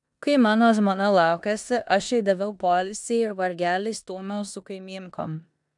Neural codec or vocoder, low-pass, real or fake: codec, 16 kHz in and 24 kHz out, 0.9 kbps, LongCat-Audio-Codec, four codebook decoder; 10.8 kHz; fake